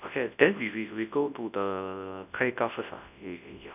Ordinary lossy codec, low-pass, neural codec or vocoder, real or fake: none; 3.6 kHz; codec, 24 kHz, 0.9 kbps, WavTokenizer, large speech release; fake